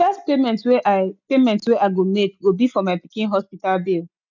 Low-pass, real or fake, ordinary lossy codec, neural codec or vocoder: 7.2 kHz; real; none; none